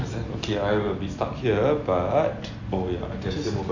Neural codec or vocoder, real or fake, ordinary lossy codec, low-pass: none; real; AAC, 48 kbps; 7.2 kHz